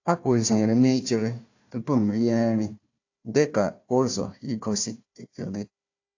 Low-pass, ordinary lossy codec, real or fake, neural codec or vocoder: 7.2 kHz; AAC, 48 kbps; fake; codec, 16 kHz, 1 kbps, FunCodec, trained on Chinese and English, 50 frames a second